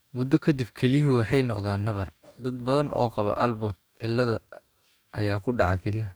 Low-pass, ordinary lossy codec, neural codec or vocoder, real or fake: none; none; codec, 44.1 kHz, 2.6 kbps, DAC; fake